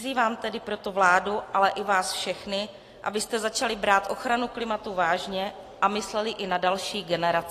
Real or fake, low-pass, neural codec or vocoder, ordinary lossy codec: real; 14.4 kHz; none; AAC, 48 kbps